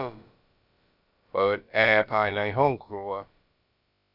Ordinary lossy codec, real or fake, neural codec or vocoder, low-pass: none; fake; codec, 16 kHz, about 1 kbps, DyCAST, with the encoder's durations; 5.4 kHz